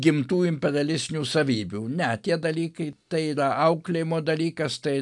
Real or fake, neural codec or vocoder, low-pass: real; none; 10.8 kHz